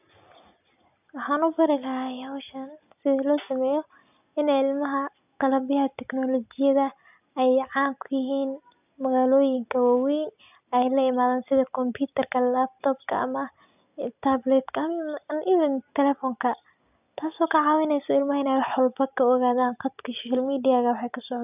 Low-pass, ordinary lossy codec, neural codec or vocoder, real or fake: 3.6 kHz; none; none; real